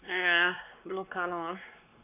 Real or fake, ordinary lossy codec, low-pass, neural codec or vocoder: fake; none; 3.6 kHz; codec, 16 kHz, 2 kbps, X-Codec, WavLM features, trained on Multilingual LibriSpeech